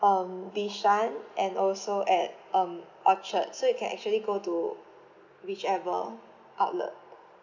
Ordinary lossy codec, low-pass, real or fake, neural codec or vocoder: none; 7.2 kHz; real; none